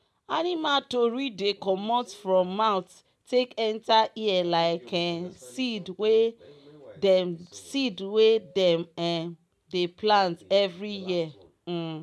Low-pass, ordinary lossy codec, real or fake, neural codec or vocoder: none; none; real; none